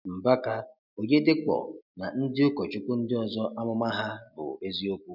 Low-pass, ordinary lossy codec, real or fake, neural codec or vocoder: 5.4 kHz; none; real; none